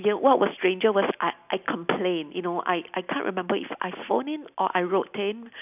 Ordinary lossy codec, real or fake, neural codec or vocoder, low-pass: none; real; none; 3.6 kHz